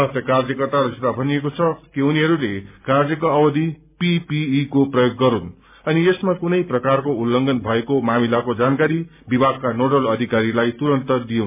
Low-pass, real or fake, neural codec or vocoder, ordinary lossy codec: 3.6 kHz; real; none; MP3, 32 kbps